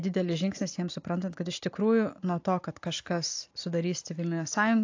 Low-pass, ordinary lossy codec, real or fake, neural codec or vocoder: 7.2 kHz; AAC, 48 kbps; real; none